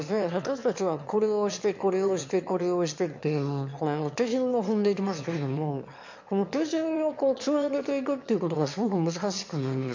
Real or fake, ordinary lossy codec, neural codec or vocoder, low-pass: fake; MP3, 48 kbps; autoencoder, 22.05 kHz, a latent of 192 numbers a frame, VITS, trained on one speaker; 7.2 kHz